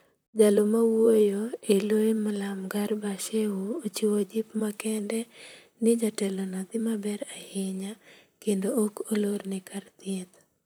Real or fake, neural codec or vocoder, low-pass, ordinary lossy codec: fake; vocoder, 44.1 kHz, 128 mel bands, Pupu-Vocoder; none; none